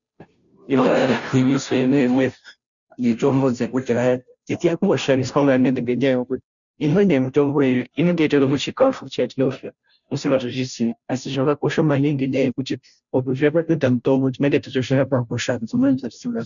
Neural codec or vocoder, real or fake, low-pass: codec, 16 kHz, 0.5 kbps, FunCodec, trained on Chinese and English, 25 frames a second; fake; 7.2 kHz